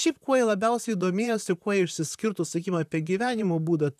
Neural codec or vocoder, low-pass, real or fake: vocoder, 44.1 kHz, 128 mel bands, Pupu-Vocoder; 14.4 kHz; fake